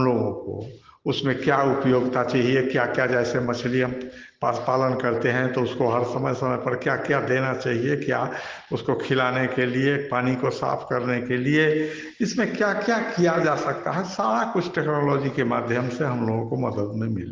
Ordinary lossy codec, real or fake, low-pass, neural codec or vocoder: Opus, 16 kbps; real; 7.2 kHz; none